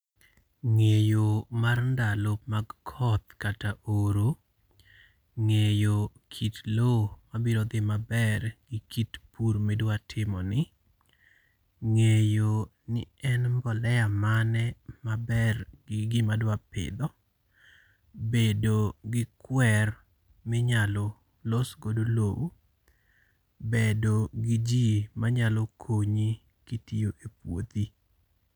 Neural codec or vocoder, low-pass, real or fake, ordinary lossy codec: none; none; real; none